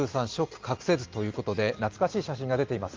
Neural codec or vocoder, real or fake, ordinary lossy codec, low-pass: none; real; Opus, 32 kbps; 7.2 kHz